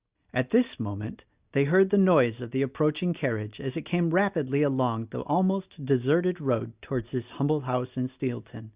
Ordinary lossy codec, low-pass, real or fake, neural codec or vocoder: Opus, 64 kbps; 3.6 kHz; real; none